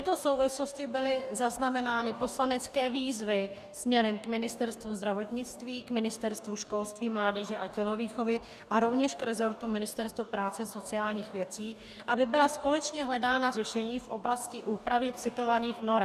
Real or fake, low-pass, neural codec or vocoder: fake; 14.4 kHz; codec, 44.1 kHz, 2.6 kbps, DAC